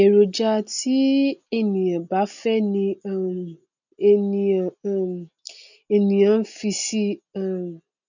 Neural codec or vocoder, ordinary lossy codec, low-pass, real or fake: none; none; 7.2 kHz; real